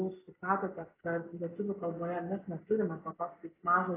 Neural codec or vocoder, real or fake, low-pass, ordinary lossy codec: none; real; 3.6 kHz; AAC, 16 kbps